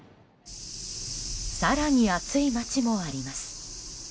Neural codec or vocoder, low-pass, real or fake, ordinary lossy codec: none; none; real; none